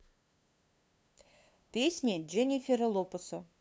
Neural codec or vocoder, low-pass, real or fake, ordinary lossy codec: codec, 16 kHz, 2 kbps, FunCodec, trained on LibriTTS, 25 frames a second; none; fake; none